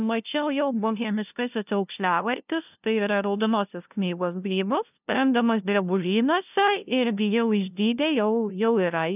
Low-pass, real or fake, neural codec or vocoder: 3.6 kHz; fake; codec, 16 kHz, 0.5 kbps, FunCodec, trained on LibriTTS, 25 frames a second